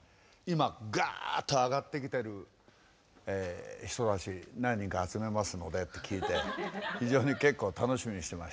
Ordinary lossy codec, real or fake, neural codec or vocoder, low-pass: none; real; none; none